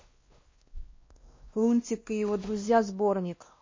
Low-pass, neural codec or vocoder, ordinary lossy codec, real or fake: 7.2 kHz; codec, 16 kHz, 1 kbps, X-Codec, WavLM features, trained on Multilingual LibriSpeech; MP3, 32 kbps; fake